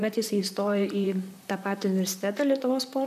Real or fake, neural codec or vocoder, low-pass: fake; vocoder, 44.1 kHz, 128 mel bands, Pupu-Vocoder; 14.4 kHz